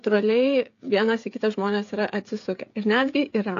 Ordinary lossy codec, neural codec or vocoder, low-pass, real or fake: AAC, 48 kbps; codec, 16 kHz, 16 kbps, FreqCodec, smaller model; 7.2 kHz; fake